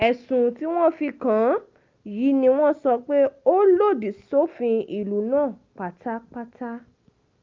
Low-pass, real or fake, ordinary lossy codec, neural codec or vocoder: 7.2 kHz; real; Opus, 24 kbps; none